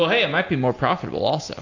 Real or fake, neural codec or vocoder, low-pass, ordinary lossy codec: real; none; 7.2 kHz; AAC, 48 kbps